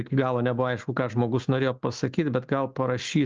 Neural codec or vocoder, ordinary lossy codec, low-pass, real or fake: none; Opus, 24 kbps; 7.2 kHz; real